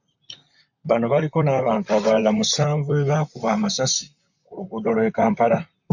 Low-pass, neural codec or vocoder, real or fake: 7.2 kHz; vocoder, 44.1 kHz, 128 mel bands, Pupu-Vocoder; fake